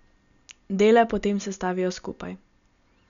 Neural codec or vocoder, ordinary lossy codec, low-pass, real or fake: none; none; 7.2 kHz; real